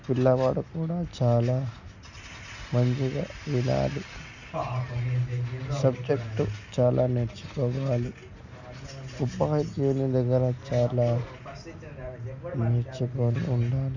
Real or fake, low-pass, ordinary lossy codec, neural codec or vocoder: real; 7.2 kHz; none; none